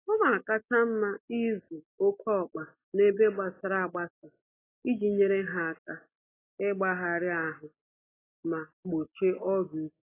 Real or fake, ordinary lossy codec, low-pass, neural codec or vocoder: real; AAC, 16 kbps; 3.6 kHz; none